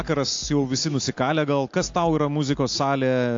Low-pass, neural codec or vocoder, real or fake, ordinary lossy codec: 7.2 kHz; none; real; AAC, 48 kbps